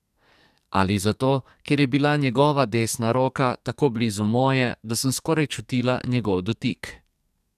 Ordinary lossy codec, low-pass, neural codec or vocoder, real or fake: none; 14.4 kHz; codec, 44.1 kHz, 2.6 kbps, SNAC; fake